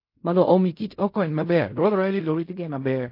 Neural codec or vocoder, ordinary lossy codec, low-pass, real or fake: codec, 16 kHz in and 24 kHz out, 0.4 kbps, LongCat-Audio-Codec, fine tuned four codebook decoder; MP3, 32 kbps; 5.4 kHz; fake